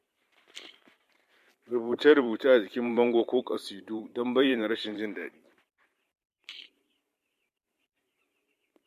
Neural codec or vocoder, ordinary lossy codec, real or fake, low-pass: vocoder, 44.1 kHz, 128 mel bands every 512 samples, BigVGAN v2; MP3, 64 kbps; fake; 14.4 kHz